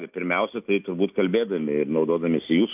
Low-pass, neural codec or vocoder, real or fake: 3.6 kHz; none; real